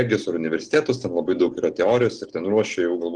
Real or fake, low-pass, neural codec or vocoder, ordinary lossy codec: real; 9.9 kHz; none; Opus, 16 kbps